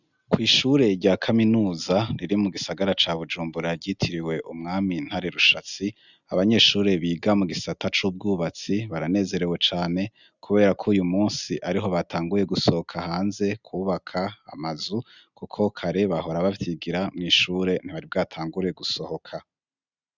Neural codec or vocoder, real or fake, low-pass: none; real; 7.2 kHz